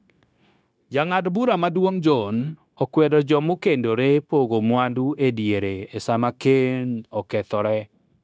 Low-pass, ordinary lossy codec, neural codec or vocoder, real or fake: none; none; codec, 16 kHz, 0.9 kbps, LongCat-Audio-Codec; fake